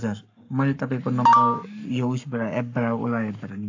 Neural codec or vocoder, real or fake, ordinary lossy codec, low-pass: codec, 44.1 kHz, 7.8 kbps, Pupu-Codec; fake; AAC, 48 kbps; 7.2 kHz